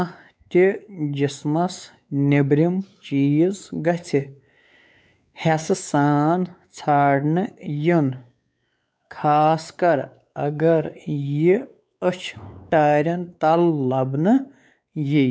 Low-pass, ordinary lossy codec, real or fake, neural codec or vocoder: none; none; fake; codec, 16 kHz, 4 kbps, X-Codec, WavLM features, trained on Multilingual LibriSpeech